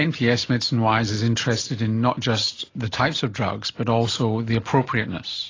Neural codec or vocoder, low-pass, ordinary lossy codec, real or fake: none; 7.2 kHz; AAC, 32 kbps; real